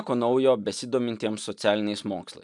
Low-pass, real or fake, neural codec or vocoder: 10.8 kHz; real; none